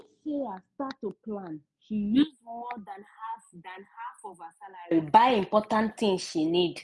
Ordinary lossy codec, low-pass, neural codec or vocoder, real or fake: none; none; none; real